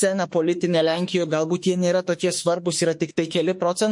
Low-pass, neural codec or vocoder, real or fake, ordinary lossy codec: 10.8 kHz; autoencoder, 48 kHz, 32 numbers a frame, DAC-VAE, trained on Japanese speech; fake; MP3, 48 kbps